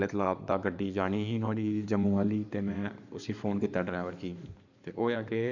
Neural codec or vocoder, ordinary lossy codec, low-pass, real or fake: codec, 16 kHz in and 24 kHz out, 2.2 kbps, FireRedTTS-2 codec; none; 7.2 kHz; fake